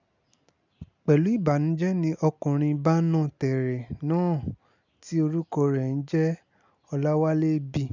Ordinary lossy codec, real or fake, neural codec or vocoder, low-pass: none; real; none; 7.2 kHz